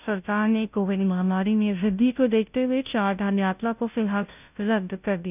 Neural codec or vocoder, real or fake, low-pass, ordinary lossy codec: codec, 16 kHz, 0.5 kbps, FunCodec, trained on Chinese and English, 25 frames a second; fake; 3.6 kHz; none